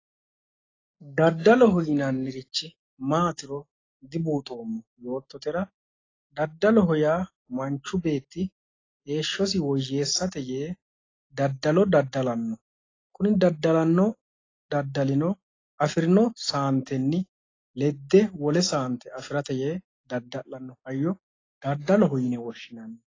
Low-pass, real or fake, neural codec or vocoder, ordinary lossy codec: 7.2 kHz; real; none; AAC, 32 kbps